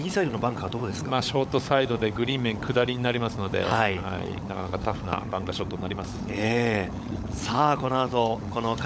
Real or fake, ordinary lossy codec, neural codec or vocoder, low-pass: fake; none; codec, 16 kHz, 16 kbps, FunCodec, trained on LibriTTS, 50 frames a second; none